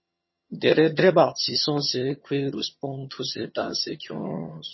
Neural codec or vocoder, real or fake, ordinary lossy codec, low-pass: vocoder, 22.05 kHz, 80 mel bands, HiFi-GAN; fake; MP3, 24 kbps; 7.2 kHz